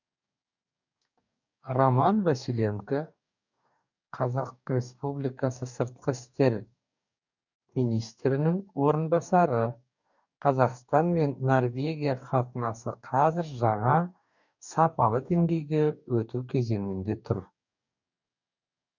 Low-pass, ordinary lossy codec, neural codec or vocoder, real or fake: 7.2 kHz; none; codec, 44.1 kHz, 2.6 kbps, DAC; fake